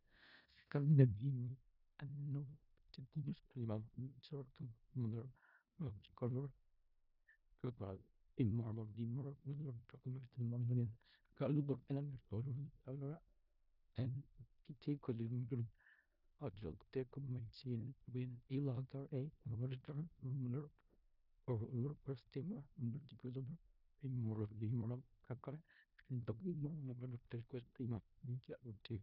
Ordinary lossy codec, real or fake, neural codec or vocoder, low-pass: none; fake; codec, 16 kHz in and 24 kHz out, 0.4 kbps, LongCat-Audio-Codec, four codebook decoder; 5.4 kHz